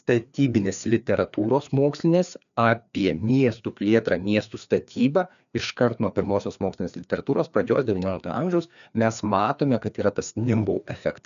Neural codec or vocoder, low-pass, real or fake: codec, 16 kHz, 2 kbps, FreqCodec, larger model; 7.2 kHz; fake